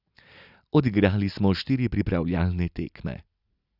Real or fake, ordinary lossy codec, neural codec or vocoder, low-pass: real; none; none; 5.4 kHz